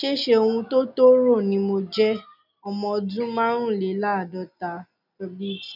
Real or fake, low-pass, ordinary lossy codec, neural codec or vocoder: real; 5.4 kHz; none; none